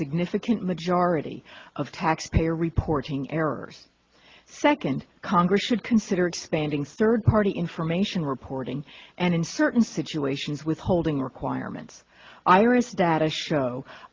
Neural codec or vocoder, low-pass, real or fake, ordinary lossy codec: none; 7.2 kHz; real; Opus, 32 kbps